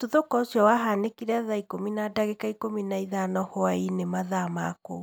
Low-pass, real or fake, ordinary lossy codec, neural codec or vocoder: none; real; none; none